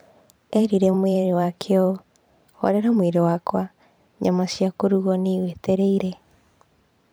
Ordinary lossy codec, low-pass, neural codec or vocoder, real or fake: none; none; vocoder, 44.1 kHz, 128 mel bands every 512 samples, BigVGAN v2; fake